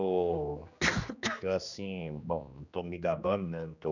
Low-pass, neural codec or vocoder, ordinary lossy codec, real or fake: 7.2 kHz; codec, 16 kHz, 2 kbps, X-Codec, HuBERT features, trained on general audio; none; fake